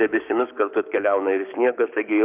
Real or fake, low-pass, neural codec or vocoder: fake; 3.6 kHz; codec, 16 kHz, 6 kbps, DAC